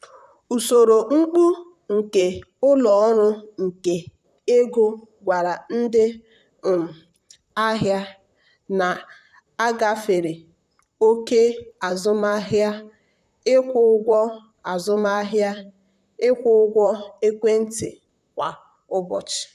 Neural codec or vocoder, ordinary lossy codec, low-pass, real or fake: vocoder, 44.1 kHz, 128 mel bands, Pupu-Vocoder; none; 14.4 kHz; fake